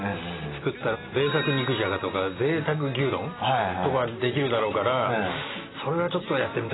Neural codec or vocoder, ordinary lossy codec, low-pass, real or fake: none; AAC, 16 kbps; 7.2 kHz; real